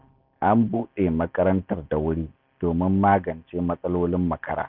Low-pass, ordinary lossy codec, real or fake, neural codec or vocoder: 5.4 kHz; none; real; none